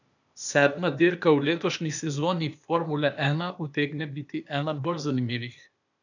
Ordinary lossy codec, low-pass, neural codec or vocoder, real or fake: none; 7.2 kHz; codec, 16 kHz, 0.8 kbps, ZipCodec; fake